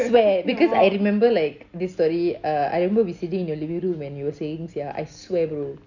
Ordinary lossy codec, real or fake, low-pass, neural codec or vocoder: none; real; 7.2 kHz; none